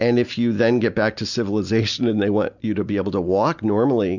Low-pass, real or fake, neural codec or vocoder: 7.2 kHz; real; none